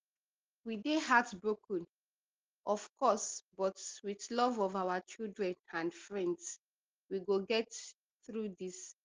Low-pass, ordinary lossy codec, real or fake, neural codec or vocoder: 7.2 kHz; Opus, 16 kbps; real; none